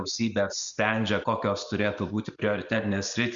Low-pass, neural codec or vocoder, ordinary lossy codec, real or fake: 7.2 kHz; none; Opus, 64 kbps; real